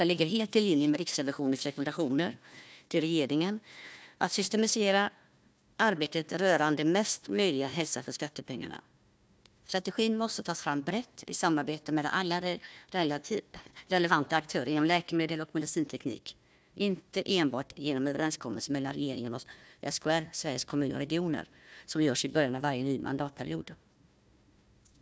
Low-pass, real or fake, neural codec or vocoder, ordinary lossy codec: none; fake; codec, 16 kHz, 1 kbps, FunCodec, trained on Chinese and English, 50 frames a second; none